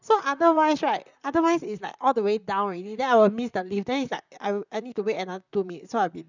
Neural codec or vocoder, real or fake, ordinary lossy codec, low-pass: vocoder, 44.1 kHz, 128 mel bands, Pupu-Vocoder; fake; none; 7.2 kHz